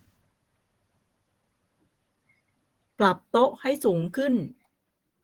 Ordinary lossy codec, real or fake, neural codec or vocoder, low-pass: Opus, 16 kbps; fake; vocoder, 48 kHz, 128 mel bands, Vocos; 19.8 kHz